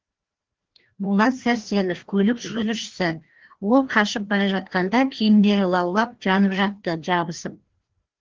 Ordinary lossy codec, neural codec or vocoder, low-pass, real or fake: Opus, 16 kbps; codec, 16 kHz, 1 kbps, FreqCodec, larger model; 7.2 kHz; fake